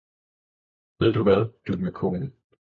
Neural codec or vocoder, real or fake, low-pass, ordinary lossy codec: codec, 16 kHz, 4 kbps, FunCodec, trained on LibriTTS, 50 frames a second; fake; 7.2 kHz; MP3, 48 kbps